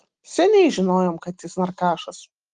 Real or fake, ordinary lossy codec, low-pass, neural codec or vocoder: real; Opus, 24 kbps; 9.9 kHz; none